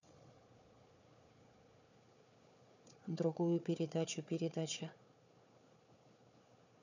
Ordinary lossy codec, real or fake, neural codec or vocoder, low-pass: none; fake; codec, 16 kHz, 4 kbps, FunCodec, trained on Chinese and English, 50 frames a second; 7.2 kHz